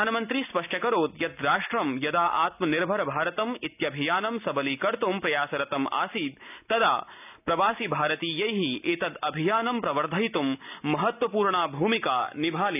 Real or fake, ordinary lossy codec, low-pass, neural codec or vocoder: real; none; 3.6 kHz; none